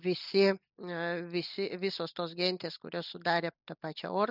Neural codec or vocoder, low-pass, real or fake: none; 5.4 kHz; real